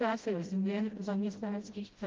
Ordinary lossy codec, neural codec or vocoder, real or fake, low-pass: Opus, 32 kbps; codec, 16 kHz, 0.5 kbps, FreqCodec, smaller model; fake; 7.2 kHz